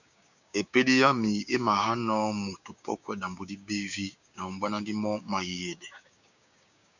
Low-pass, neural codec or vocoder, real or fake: 7.2 kHz; codec, 16 kHz, 6 kbps, DAC; fake